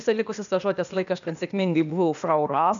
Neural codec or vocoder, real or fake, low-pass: codec, 16 kHz, 0.8 kbps, ZipCodec; fake; 7.2 kHz